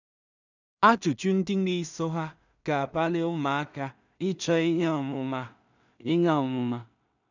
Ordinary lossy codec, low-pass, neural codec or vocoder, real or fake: none; 7.2 kHz; codec, 16 kHz in and 24 kHz out, 0.4 kbps, LongCat-Audio-Codec, two codebook decoder; fake